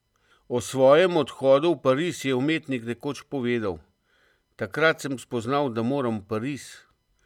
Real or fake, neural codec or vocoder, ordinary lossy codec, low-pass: real; none; none; 19.8 kHz